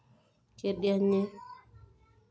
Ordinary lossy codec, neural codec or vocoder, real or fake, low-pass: none; none; real; none